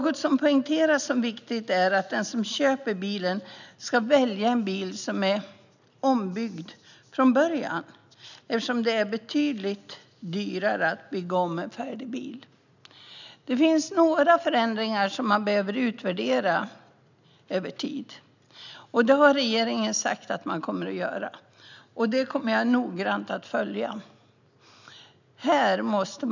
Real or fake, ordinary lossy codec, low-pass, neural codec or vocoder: real; none; 7.2 kHz; none